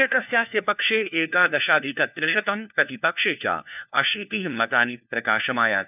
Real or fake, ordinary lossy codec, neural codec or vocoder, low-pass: fake; none; codec, 16 kHz, 1 kbps, FunCodec, trained on LibriTTS, 50 frames a second; 3.6 kHz